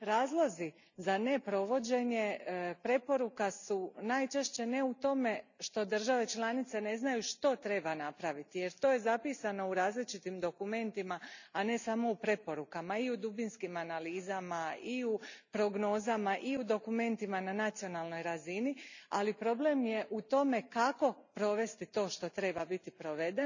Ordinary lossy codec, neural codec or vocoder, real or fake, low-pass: none; none; real; 7.2 kHz